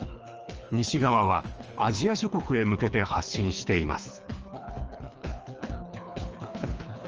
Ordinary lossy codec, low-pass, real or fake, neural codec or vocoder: Opus, 24 kbps; 7.2 kHz; fake; codec, 24 kHz, 3 kbps, HILCodec